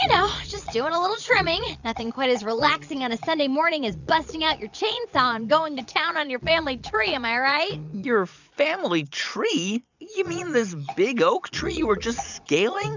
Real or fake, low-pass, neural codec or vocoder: fake; 7.2 kHz; vocoder, 22.05 kHz, 80 mel bands, Vocos